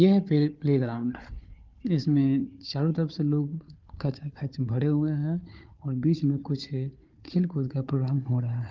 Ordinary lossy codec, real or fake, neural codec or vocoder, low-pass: Opus, 24 kbps; fake; codec, 16 kHz, 4 kbps, FunCodec, trained on Chinese and English, 50 frames a second; 7.2 kHz